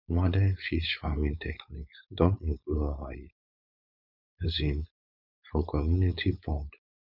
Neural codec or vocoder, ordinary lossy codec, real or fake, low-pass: codec, 16 kHz, 4.8 kbps, FACodec; none; fake; 5.4 kHz